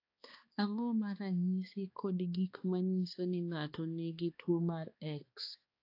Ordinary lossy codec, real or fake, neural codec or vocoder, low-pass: AAC, 32 kbps; fake; codec, 16 kHz, 2 kbps, X-Codec, HuBERT features, trained on balanced general audio; 5.4 kHz